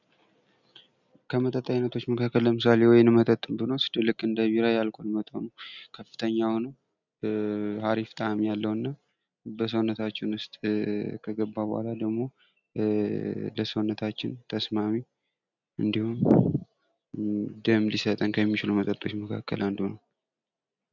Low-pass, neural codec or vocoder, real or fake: 7.2 kHz; none; real